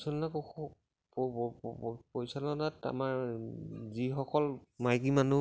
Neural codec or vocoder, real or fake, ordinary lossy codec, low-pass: none; real; none; none